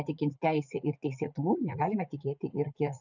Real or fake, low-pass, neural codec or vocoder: fake; 7.2 kHz; vocoder, 44.1 kHz, 80 mel bands, Vocos